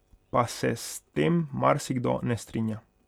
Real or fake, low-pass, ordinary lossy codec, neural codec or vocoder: real; 19.8 kHz; none; none